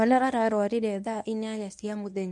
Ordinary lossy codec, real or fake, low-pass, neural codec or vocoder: none; fake; none; codec, 24 kHz, 0.9 kbps, WavTokenizer, medium speech release version 1